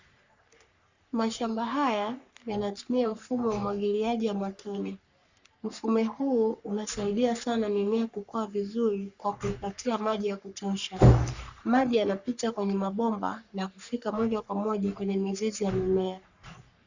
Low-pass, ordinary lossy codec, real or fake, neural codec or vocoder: 7.2 kHz; Opus, 64 kbps; fake; codec, 44.1 kHz, 3.4 kbps, Pupu-Codec